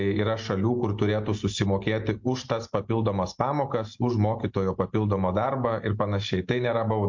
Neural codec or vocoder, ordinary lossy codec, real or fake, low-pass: none; MP3, 48 kbps; real; 7.2 kHz